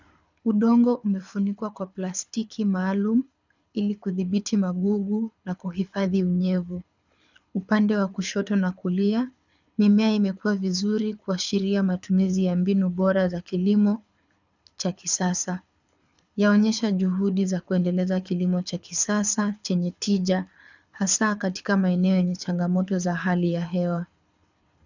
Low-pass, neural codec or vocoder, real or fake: 7.2 kHz; codec, 24 kHz, 6 kbps, HILCodec; fake